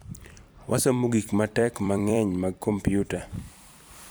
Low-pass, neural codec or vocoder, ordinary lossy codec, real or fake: none; vocoder, 44.1 kHz, 128 mel bands every 256 samples, BigVGAN v2; none; fake